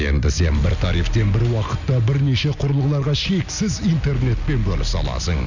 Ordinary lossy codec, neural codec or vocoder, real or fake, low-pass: none; none; real; 7.2 kHz